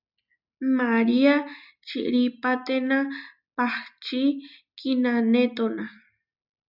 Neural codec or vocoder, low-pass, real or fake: none; 5.4 kHz; real